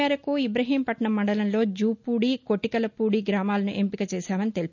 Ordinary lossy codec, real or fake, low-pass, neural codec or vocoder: none; real; 7.2 kHz; none